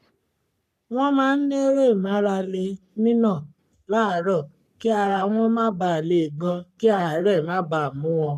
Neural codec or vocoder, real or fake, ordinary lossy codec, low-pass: codec, 44.1 kHz, 3.4 kbps, Pupu-Codec; fake; none; 14.4 kHz